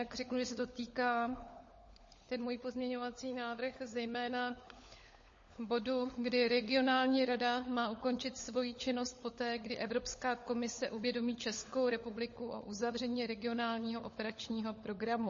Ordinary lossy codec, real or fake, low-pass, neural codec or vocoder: MP3, 32 kbps; fake; 7.2 kHz; codec, 16 kHz, 16 kbps, FunCodec, trained on LibriTTS, 50 frames a second